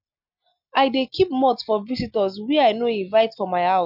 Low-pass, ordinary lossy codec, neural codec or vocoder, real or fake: 5.4 kHz; none; none; real